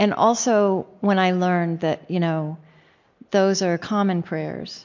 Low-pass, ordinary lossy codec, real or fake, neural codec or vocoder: 7.2 kHz; MP3, 48 kbps; real; none